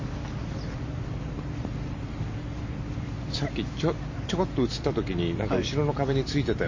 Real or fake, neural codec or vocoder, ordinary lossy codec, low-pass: real; none; MP3, 32 kbps; 7.2 kHz